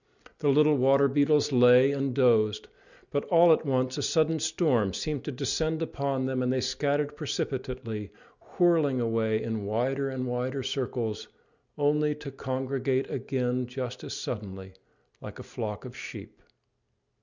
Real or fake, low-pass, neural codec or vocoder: real; 7.2 kHz; none